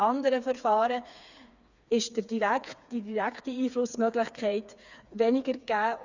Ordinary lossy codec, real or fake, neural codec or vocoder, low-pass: Opus, 64 kbps; fake; codec, 16 kHz, 4 kbps, FreqCodec, smaller model; 7.2 kHz